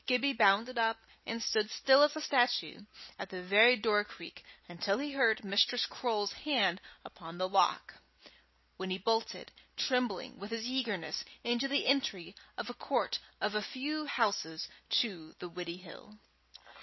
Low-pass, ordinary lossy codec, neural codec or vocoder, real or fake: 7.2 kHz; MP3, 24 kbps; none; real